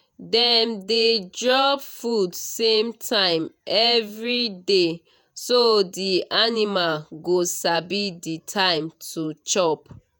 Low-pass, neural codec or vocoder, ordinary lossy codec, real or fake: none; vocoder, 48 kHz, 128 mel bands, Vocos; none; fake